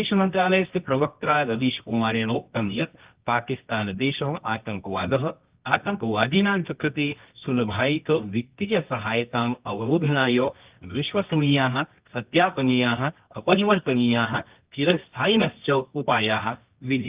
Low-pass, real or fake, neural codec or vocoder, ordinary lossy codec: 3.6 kHz; fake; codec, 24 kHz, 0.9 kbps, WavTokenizer, medium music audio release; Opus, 24 kbps